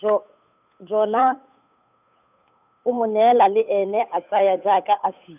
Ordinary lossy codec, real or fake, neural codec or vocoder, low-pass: none; fake; codec, 16 kHz, 8 kbps, FunCodec, trained on Chinese and English, 25 frames a second; 3.6 kHz